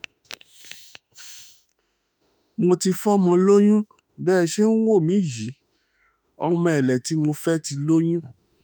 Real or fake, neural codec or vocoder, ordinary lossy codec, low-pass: fake; autoencoder, 48 kHz, 32 numbers a frame, DAC-VAE, trained on Japanese speech; none; none